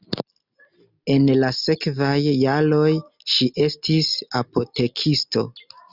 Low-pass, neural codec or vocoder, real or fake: 5.4 kHz; none; real